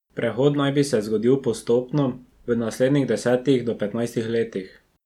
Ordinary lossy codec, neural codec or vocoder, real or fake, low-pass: none; none; real; 19.8 kHz